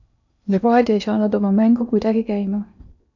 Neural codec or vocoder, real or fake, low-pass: codec, 16 kHz in and 24 kHz out, 0.8 kbps, FocalCodec, streaming, 65536 codes; fake; 7.2 kHz